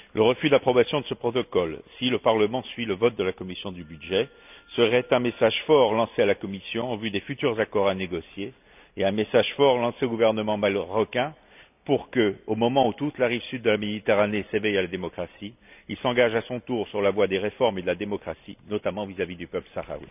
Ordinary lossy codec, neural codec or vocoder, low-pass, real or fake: none; none; 3.6 kHz; real